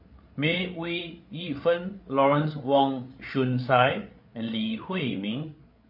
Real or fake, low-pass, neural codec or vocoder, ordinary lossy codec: fake; 5.4 kHz; codec, 16 kHz, 8 kbps, FreqCodec, larger model; MP3, 32 kbps